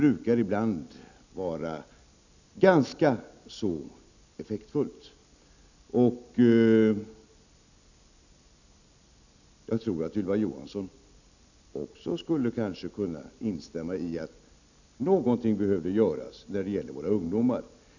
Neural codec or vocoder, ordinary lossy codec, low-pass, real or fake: none; none; 7.2 kHz; real